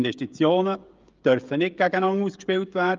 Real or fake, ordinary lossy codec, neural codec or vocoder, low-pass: fake; Opus, 24 kbps; codec, 16 kHz, 16 kbps, FreqCodec, smaller model; 7.2 kHz